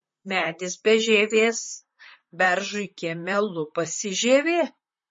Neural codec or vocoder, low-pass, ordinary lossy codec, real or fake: vocoder, 44.1 kHz, 128 mel bands, Pupu-Vocoder; 10.8 kHz; MP3, 32 kbps; fake